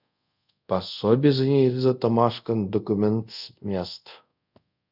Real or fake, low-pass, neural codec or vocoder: fake; 5.4 kHz; codec, 24 kHz, 0.5 kbps, DualCodec